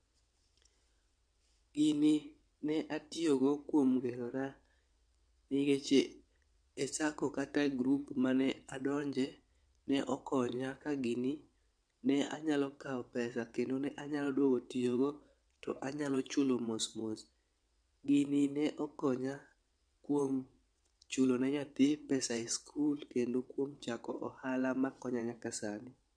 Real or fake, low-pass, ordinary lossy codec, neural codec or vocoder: fake; 9.9 kHz; MP3, 64 kbps; vocoder, 22.05 kHz, 80 mel bands, WaveNeXt